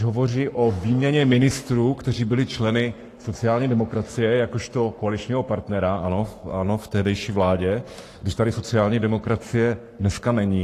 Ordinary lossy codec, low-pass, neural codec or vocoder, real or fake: AAC, 48 kbps; 14.4 kHz; codec, 44.1 kHz, 7.8 kbps, Pupu-Codec; fake